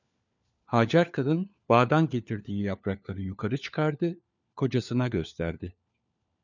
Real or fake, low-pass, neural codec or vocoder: fake; 7.2 kHz; codec, 16 kHz, 4 kbps, FunCodec, trained on LibriTTS, 50 frames a second